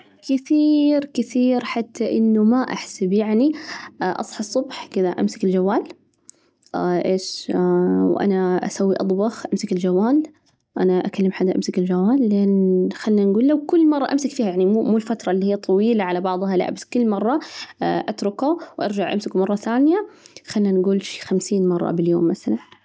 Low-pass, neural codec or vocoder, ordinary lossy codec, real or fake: none; none; none; real